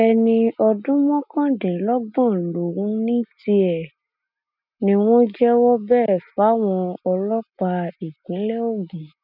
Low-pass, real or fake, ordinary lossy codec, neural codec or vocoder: 5.4 kHz; real; none; none